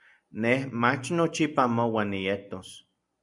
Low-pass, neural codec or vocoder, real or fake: 10.8 kHz; none; real